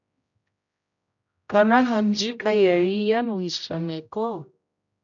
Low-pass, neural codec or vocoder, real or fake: 7.2 kHz; codec, 16 kHz, 0.5 kbps, X-Codec, HuBERT features, trained on general audio; fake